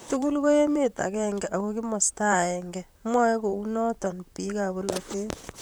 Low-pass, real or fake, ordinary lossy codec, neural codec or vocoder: none; fake; none; vocoder, 44.1 kHz, 128 mel bands, Pupu-Vocoder